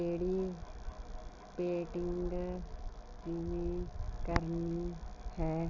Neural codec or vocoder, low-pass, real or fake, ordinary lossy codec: none; 7.2 kHz; real; Opus, 24 kbps